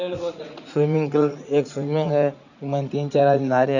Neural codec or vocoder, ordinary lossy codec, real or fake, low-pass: vocoder, 44.1 kHz, 80 mel bands, Vocos; none; fake; 7.2 kHz